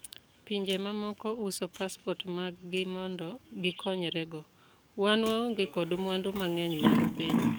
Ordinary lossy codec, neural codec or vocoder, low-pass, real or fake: none; codec, 44.1 kHz, 7.8 kbps, DAC; none; fake